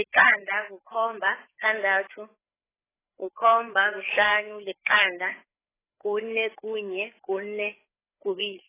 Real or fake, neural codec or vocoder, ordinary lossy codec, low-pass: fake; codec, 16 kHz, 4 kbps, FreqCodec, larger model; AAC, 16 kbps; 3.6 kHz